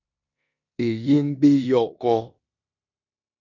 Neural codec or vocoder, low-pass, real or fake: codec, 16 kHz in and 24 kHz out, 0.9 kbps, LongCat-Audio-Codec, four codebook decoder; 7.2 kHz; fake